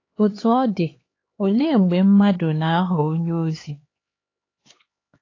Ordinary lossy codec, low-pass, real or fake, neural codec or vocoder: AAC, 32 kbps; 7.2 kHz; fake; codec, 16 kHz, 4 kbps, X-Codec, HuBERT features, trained on LibriSpeech